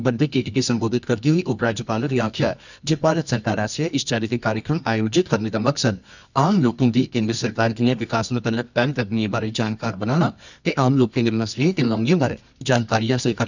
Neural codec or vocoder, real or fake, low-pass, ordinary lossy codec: codec, 24 kHz, 0.9 kbps, WavTokenizer, medium music audio release; fake; 7.2 kHz; none